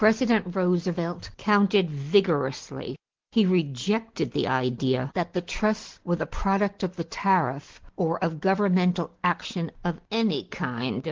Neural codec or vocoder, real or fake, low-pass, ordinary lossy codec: none; real; 7.2 kHz; Opus, 16 kbps